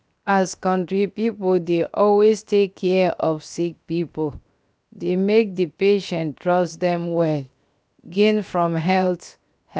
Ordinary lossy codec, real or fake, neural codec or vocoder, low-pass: none; fake; codec, 16 kHz, 0.7 kbps, FocalCodec; none